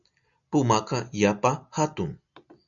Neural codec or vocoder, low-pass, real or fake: none; 7.2 kHz; real